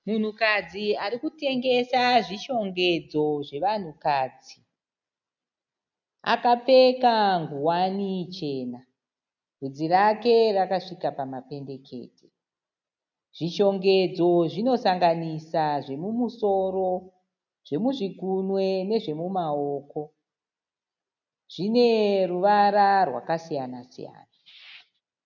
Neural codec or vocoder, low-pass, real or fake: none; 7.2 kHz; real